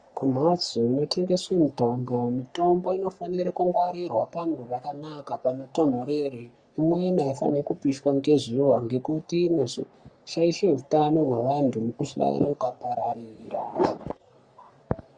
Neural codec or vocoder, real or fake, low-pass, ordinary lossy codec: codec, 44.1 kHz, 3.4 kbps, Pupu-Codec; fake; 9.9 kHz; Opus, 64 kbps